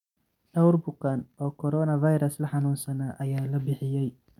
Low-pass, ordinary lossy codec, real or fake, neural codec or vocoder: 19.8 kHz; none; real; none